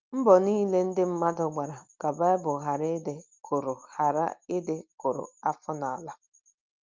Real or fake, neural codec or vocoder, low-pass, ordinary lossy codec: real; none; 7.2 kHz; Opus, 24 kbps